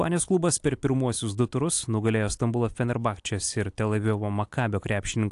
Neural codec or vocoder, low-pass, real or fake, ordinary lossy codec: none; 10.8 kHz; real; AAC, 64 kbps